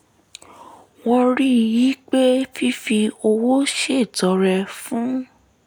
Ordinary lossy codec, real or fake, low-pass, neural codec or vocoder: none; real; none; none